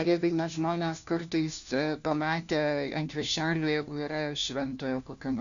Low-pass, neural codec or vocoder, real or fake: 7.2 kHz; codec, 16 kHz, 1 kbps, FunCodec, trained on LibriTTS, 50 frames a second; fake